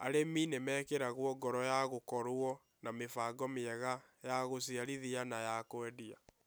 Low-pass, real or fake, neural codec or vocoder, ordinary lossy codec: none; real; none; none